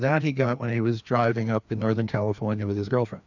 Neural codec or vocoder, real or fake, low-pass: codec, 16 kHz, 2 kbps, FreqCodec, larger model; fake; 7.2 kHz